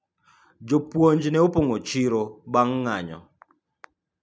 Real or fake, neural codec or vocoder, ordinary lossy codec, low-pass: real; none; none; none